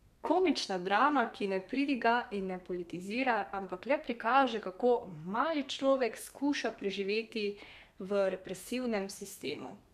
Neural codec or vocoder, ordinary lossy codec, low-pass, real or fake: codec, 32 kHz, 1.9 kbps, SNAC; none; 14.4 kHz; fake